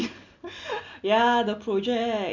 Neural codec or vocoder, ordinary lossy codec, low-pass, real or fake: none; none; 7.2 kHz; real